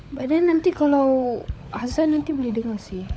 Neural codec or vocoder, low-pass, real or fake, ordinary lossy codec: codec, 16 kHz, 16 kbps, FunCodec, trained on LibriTTS, 50 frames a second; none; fake; none